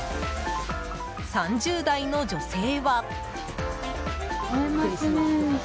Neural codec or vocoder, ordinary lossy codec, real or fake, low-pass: none; none; real; none